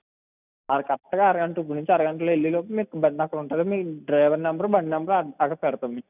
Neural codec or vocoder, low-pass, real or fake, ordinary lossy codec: none; 3.6 kHz; real; none